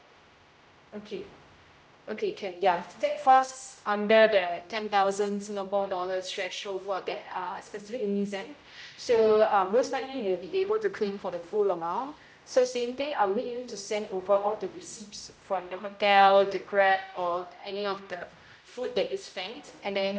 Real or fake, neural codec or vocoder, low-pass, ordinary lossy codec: fake; codec, 16 kHz, 0.5 kbps, X-Codec, HuBERT features, trained on general audio; none; none